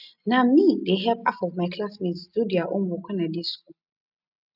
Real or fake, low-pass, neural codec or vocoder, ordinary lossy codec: real; 5.4 kHz; none; none